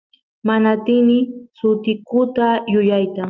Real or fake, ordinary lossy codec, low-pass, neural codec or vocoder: real; Opus, 24 kbps; 7.2 kHz; none